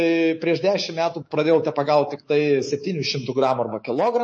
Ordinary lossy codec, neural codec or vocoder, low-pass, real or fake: MP3, 32 kbps; codec, 24 kHz, 3.1 kbps, DualCodec; 9.9 kHz; fake